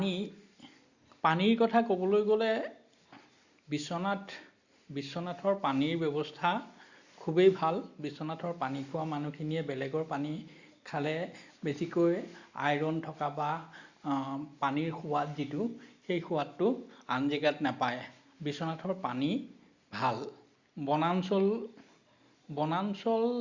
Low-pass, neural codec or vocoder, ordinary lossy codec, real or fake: 7.2 kHz; none; Opus, 64 kbps; real